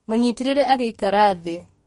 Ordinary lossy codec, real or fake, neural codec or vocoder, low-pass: MP3, 48 kbps; fake; codec, 44.1 kHz, 2.6 kbps, DAC; 19.8 kHz